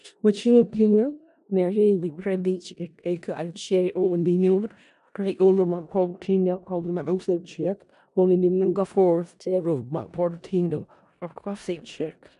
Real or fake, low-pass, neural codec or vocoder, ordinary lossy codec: fake; 10.8 kHz; codec, 16 kHz in and 24 kHz out, 0.4 kbps, LongCat-Audio-Codec, four codebook decoder; none